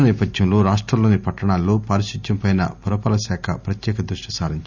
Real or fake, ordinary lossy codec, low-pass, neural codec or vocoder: real; none; 7.2 kHz; none